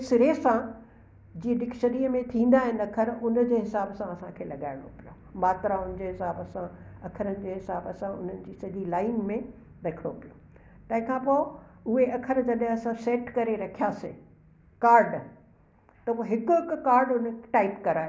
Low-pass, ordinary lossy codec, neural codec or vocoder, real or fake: none; none; none; real